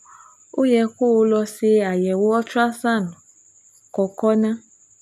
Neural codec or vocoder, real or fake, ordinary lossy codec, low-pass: none; real; none; 14.4 kHz